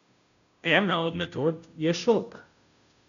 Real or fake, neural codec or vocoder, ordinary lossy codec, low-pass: fake; codec, 16 kHz, 0.5 kbps, FunCodec, trained on Chinese and English, 25 frames a second; none; 7.2 kHz